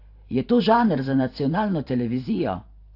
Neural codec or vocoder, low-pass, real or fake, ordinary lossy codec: vocoder, 44.1 kHz, 128 mel bands every 512 samples, BigVGAN v2; 5.4 kHz; fake; AAC, 32 kbps